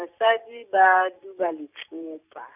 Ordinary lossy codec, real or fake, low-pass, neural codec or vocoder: none; real; 3.6 kHz; none